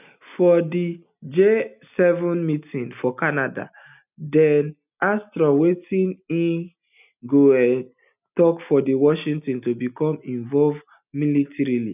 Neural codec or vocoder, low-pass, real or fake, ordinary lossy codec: none; 3.6 kHz; real; none